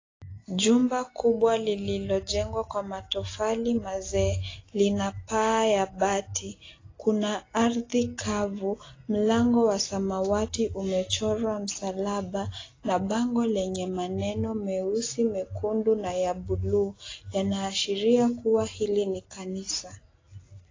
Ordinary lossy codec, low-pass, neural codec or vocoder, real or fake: AAC, 32 kbps; 7.2 kHz; none; real